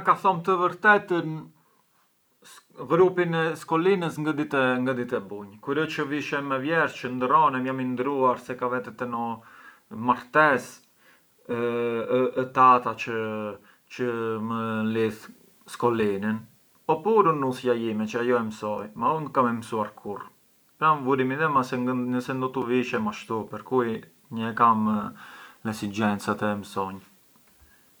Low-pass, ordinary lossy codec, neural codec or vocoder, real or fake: none; none; none; real